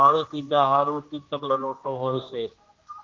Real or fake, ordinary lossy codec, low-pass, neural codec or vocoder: fake; Opus, 24 kbps; 7.2 kHz; codec, 16 kHz, 1 kbps, X-Codec, HuBERT features, trained on general audio